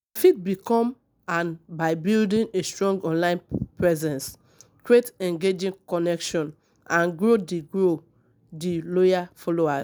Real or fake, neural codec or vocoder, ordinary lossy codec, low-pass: real; none; none; none